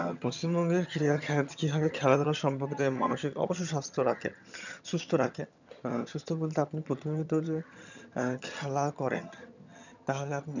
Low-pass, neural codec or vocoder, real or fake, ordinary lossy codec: 7.2 kHz; vocoder, 22.05 kHz, 80 mel bands, HiFi-GAN; fake; none